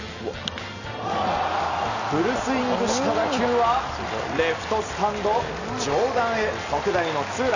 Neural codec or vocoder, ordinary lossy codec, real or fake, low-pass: none; none; real; 7.2 kHz